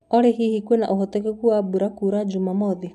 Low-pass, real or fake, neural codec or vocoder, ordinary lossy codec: 14.4 kHz; real; none; none